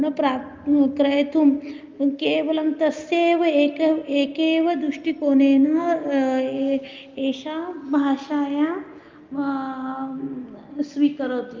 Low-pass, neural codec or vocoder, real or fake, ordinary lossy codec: 7.2 kHz; none; real; Opus, 32 kbps